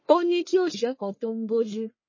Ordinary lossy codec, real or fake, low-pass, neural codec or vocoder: MP3, 32 kbps; fake; 7.2 kHz; codec, 44.1 kHz, 1.7 kbps, Pupu-Codec